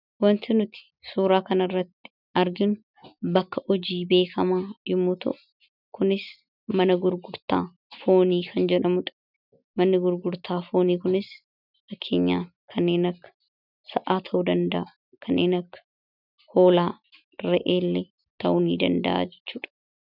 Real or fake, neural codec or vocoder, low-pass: real; none; 5.4 kHz